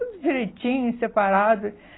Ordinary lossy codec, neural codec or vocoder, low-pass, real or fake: AAC, 16 kbps; none; 7.2 kHz; real